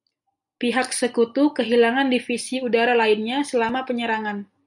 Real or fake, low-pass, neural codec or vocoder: real; 10.8 kHz; none